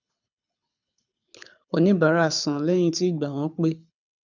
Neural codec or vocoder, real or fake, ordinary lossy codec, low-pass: codec, 24 kHz, 6 kbps, HILCodec; fake; none; 7.2 kHz